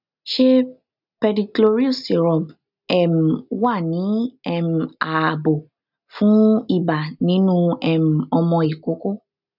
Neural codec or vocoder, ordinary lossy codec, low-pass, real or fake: none; none; 5.4 kHz; real